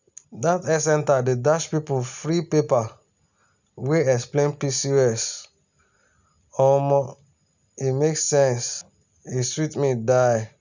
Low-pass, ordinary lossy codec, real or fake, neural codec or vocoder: 7.2 kHz; none; real; none